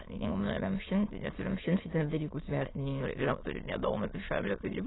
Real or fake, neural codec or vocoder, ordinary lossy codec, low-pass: fake; autoencoder, 22.05 kHz, a latent of 192 numbers a frame, VITS, trained on many speakers; AAC, 16 kbps; 7.2 kHz